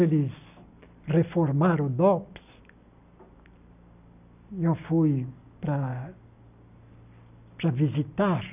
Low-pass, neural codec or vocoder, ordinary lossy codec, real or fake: 3.6 kHz; none; none; real